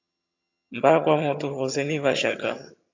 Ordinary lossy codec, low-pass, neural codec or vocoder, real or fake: AAC, 48 kbps; 7.2 kHz; vocoder, 22.05 kHz, 80 mel bands, HiFi-GAN; fake